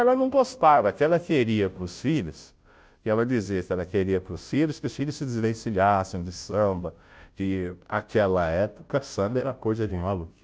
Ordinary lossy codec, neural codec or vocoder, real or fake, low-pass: none; codec, 16 kHz, 0.5 kbps, FunCodec, trained on Chinese and English, 25 frames a second; fake; none